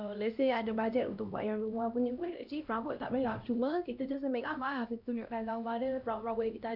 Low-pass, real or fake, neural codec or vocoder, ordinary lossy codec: 5.4 kHz; fake; codec, 16 kHz, 1 kbps, X-Codec, HuBERT features, trained on LibriSpeech; none